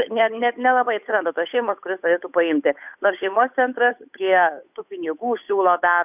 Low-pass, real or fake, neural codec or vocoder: 3.6 kHz; fake; codec, 16 kHz, 8 kbps, FunCodec, trained on Chinese and English, 25 frames a second